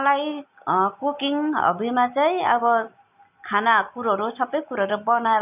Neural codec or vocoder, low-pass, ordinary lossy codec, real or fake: none; 3.6 kHz; none; real